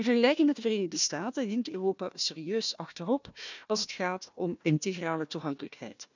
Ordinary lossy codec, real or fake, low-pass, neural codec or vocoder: none; fake; 7.2 kHz; codec, 16 kHz, 1 kbps, FunCodec, trained on Chinese and English, 50 frames a second